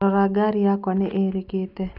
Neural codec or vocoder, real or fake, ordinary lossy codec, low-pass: none; real; none; 5.4 kHz